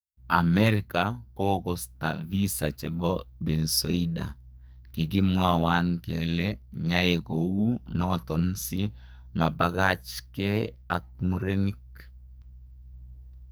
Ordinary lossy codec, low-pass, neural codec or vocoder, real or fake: none; none; codec, 44.1 kHz, 2.6 kbps, SNAC; fake